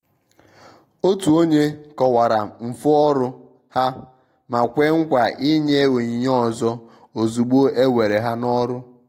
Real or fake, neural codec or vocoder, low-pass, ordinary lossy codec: real; none; 19.8 kHz; AAC, 48 kbps